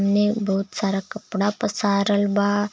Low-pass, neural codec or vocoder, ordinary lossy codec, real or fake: none; none; none; real